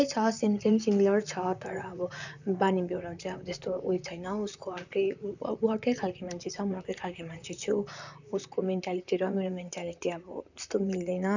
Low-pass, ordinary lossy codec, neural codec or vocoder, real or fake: 7.2 kHz; none; vocoder, 44.1 kHz, 128 mel bands, Pupu-Vocoder; fake